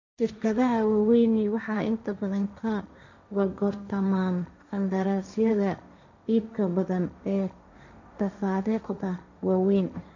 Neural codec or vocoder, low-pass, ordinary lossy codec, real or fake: codec, 16 kHz, 1.1 kbps, Voila-Tokenizer; 7.2 kHz; none; fake